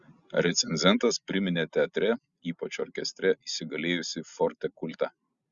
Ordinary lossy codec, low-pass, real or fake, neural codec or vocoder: Opus, 64 kbps; 7.2 kHz; real; none